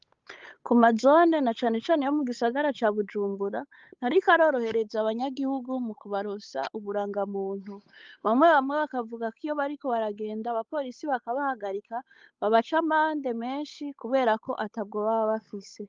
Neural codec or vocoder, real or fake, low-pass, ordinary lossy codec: codec, 16 kHz, 8 kbps, FunCodec, trained on Chinese and English, 25 frames a second; fake; 7.2 kHz; Opus, 32 kbps